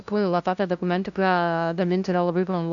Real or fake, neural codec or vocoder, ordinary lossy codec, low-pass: fake; codec, 16 kHz, 0.5 kbps, FunCodec, trained on LibriTTS, 25 frames a second; AAC, 64 kbps; 7.2 kHz